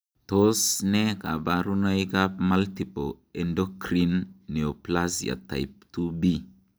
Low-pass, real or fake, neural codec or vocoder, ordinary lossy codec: none; real; none; none